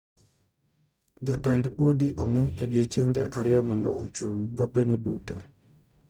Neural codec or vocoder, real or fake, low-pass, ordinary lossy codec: codec, 44.1 kHz, 0.9 kbps, DAC; fake; none; none